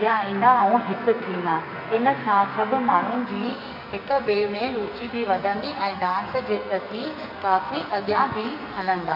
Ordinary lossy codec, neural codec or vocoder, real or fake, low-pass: none; codec, 44.1 kHz, 2.6 kbps, SNAC; fake; 5.4 kHz